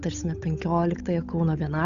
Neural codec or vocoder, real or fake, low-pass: codec, 16 kHz, 8 kbps, FunCodec, trained on Chinese and English, 25 frames a second; fake; 7.2 kHz